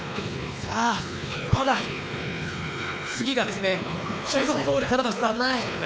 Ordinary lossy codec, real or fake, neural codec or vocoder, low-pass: none; fake; codec, 16 kHz, 2 kbps, X-Codec, WavLM features, trained on Multilingual LibriSpeech; none